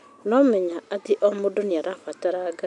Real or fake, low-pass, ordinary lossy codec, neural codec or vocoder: real; 10.8 kHz; none; none